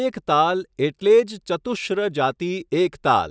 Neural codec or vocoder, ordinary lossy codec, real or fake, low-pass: none; none; real; none